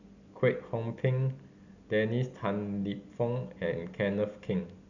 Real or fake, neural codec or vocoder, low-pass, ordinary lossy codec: real; none; 7.2 kHz; none